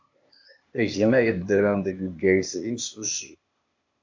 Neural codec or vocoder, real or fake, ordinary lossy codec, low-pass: codec, 16 kHz, 0.8 kbps, ZipCodec; fake; MP3, 64 kbps; 7.2 kHz